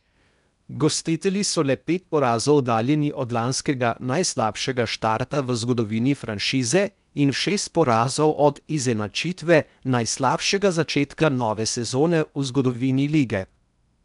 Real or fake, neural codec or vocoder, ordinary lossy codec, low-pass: fake; codec, 16 kHz in and 24 kHz out, 0.8 kbps, FocalCodec, streaming, 65536 codes; none; 10.8 kHz